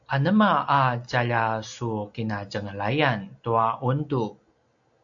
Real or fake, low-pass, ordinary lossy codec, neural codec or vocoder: real; 7.2 kHz; AAC, 64 kbps; none